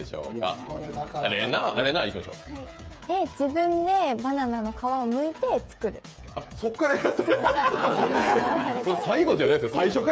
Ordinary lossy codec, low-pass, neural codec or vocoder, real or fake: none; none; codec, 16 kHz, 8 kbps, FreqCodec, smaller model; fake